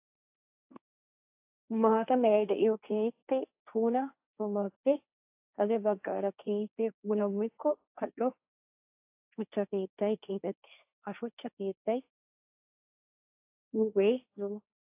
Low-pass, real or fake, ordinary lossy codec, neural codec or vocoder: 3.6 kHz; fake; AAC, 32 kbps; codec, 16 kHz, 1.1 kbps, Voila-Tokenizer